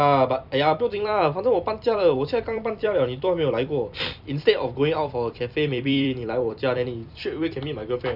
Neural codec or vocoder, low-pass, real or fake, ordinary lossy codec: vocoder, 44.1 kHz, 128 mel bands every 256 samples, BigVGAN v2; 5.4 kHz; fake; none